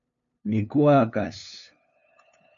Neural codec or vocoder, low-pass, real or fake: codec, 16 kHz, 2 kbps, FunCodec, trained on LibriTTS, 25 frames a second; 7.2 kHz; fake